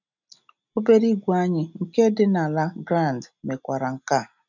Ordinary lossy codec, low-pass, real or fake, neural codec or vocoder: none; 7.2 kHz; real; none